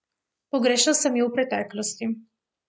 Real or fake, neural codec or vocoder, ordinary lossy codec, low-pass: real; none; none; none